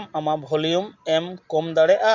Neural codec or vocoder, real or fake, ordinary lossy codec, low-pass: none; real; MP3, 48 kbps; 7.2 kHz